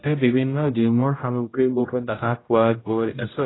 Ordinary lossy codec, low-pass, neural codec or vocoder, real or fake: AAC, 16 kbps; 7.2 kHz; codec, 16 kHz, 0.5 kbps, X-Codec, HuBERT features, trained on general audio; fake